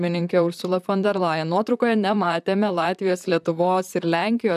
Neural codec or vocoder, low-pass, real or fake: vocoder, 44.1 kHz, 128 mel bands, Pupu-Vocoder; 14.4 kHz; fake